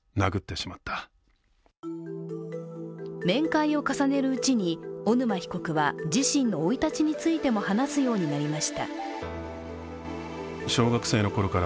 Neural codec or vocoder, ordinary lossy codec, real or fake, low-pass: none; none; real; none